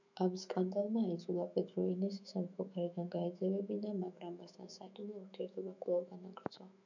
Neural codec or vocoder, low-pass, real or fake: autoencoder, 48 kHz, 128 numbers a frame, DAC-VAE, trained on Japanese speech; 7.2 kHz; fake